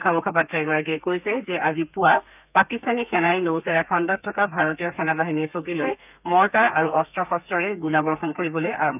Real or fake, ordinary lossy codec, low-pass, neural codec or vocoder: fake; none; 3.6 kHz; codec, 32 kHz, 1.9 kbps, SNAC